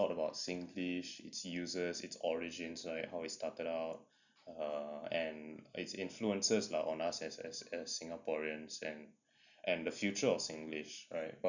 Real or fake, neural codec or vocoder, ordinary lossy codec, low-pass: real; none; none; 7.2 kHz